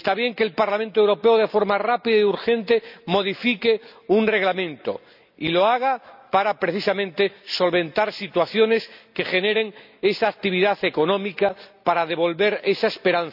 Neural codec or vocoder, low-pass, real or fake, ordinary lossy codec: none; 5.4 kHz; real; none